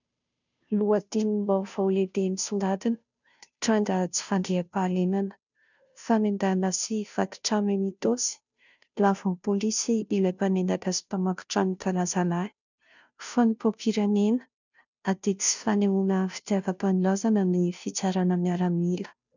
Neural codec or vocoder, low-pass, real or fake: codec, 16 kHz, 0.5 kbps, FunCodec, trained on Chinese and English, 25 frames a second; 7.2 kHz; fake